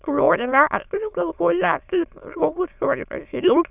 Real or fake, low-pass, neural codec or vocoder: fake; 3.6 kHz; autoencoder, 22.05 kHz, a latent of 192 numbers a frame, VITS, trained on many speakers